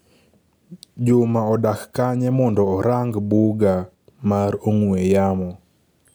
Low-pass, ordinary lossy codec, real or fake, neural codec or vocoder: none; none; real; none